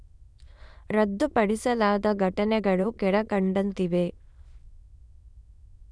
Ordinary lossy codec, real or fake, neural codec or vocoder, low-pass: none; fake; autoencoder, 22.05 kHz, a latent of 192 numbers a frame, VITS, trained on many speakers; none